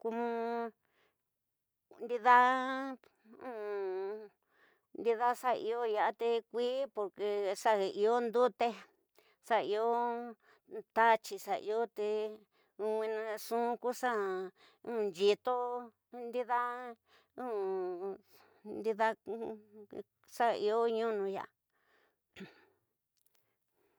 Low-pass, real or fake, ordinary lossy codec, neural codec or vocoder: none; real; none; none